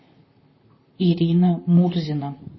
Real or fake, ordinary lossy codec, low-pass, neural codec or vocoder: fake; MP3, 24 kbps; 7.2 kHz; vocoder, 44.1 kHz, 128 mel bands, Pupu-Vocoder